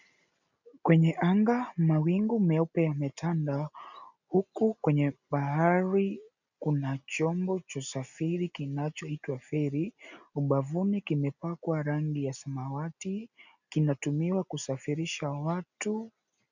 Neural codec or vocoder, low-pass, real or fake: none; 7.2 kHz; real